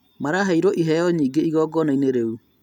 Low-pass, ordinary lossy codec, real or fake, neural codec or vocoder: 19.8 kHz; none; real; none